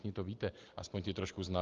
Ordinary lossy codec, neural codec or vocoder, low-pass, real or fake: Opus, 32 kbps; none; 7.2 kHz; real